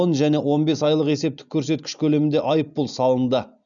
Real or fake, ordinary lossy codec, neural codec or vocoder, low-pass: real; none; none; 7.2 kHz